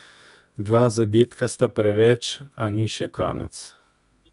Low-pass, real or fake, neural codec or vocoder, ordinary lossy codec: 10.8 kHz; fake; codec, 24 kHz, 0.9 kbps, WavTokenizer, medium music audio release; none